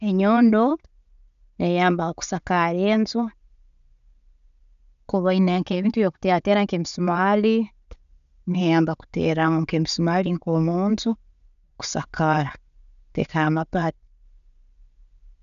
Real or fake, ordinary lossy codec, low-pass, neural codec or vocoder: real; none; 7.2 kHz; none